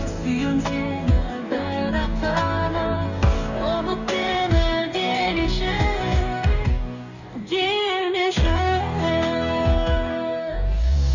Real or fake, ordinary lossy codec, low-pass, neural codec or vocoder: fake; none; 7.2 kHz; codec, 44.1 kHz, 2.6 kbps, DAC